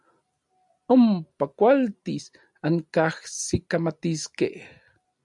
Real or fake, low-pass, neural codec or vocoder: real; 10.8 kHz; none